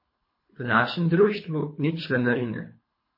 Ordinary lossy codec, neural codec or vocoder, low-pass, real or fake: MP3, 24 kbps; codec, 24 kHz, 3 kbps, HILCodec; 5.4 kHz; fake